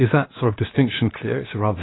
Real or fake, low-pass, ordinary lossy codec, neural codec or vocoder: fake; 7.2 kHz; AAC, 16 kbps; codec, 16 kHz, 0.7 kbps, FocalCodec